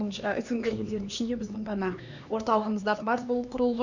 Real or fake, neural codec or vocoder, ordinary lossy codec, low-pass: fake; codec, 16 kHz, 2 kbps, X-Codec, HuBERT features, trained on LibriSpeech; none; 7.2 kHz